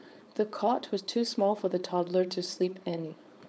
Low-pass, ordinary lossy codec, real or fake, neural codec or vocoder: none; none; fake; codec, 16 kHz, 4.8 kbps, FACodec